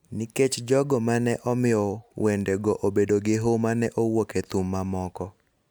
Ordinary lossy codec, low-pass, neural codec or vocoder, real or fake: none; none; none; real